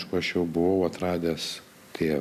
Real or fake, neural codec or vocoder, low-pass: real; none; 14.4 kHz